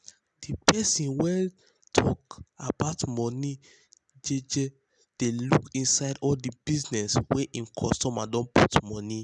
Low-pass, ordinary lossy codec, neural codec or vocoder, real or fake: 10.8 kHz; none; none; real